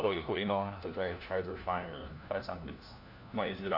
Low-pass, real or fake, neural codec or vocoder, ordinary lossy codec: 5.4 kHz; fake; codec, 16 kHz, 1 kbps, FunCodec, trained on LibriTTS, 50 frames a second; none